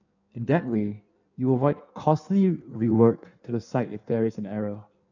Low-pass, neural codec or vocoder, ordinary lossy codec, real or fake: 7.2 kHz; codec, 16 kHz in and 24 kHz out, 1.1 kbps, FireRedTTS-2 codec; none; fake